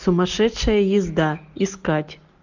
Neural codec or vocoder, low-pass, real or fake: none; 7.2 kHz; real